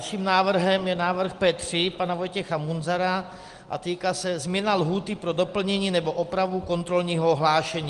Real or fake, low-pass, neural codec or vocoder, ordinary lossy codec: real; 10.8 kHz; none; Opus, 24 kbps